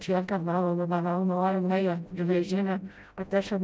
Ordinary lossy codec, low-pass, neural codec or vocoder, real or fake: none; none; codec, 16 kHz, 0.5 kbps, FreqCodec, smaller model; fake